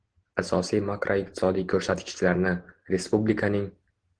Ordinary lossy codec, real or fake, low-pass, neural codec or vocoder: Opus, 16 kbps; real; 9.9 kHz; none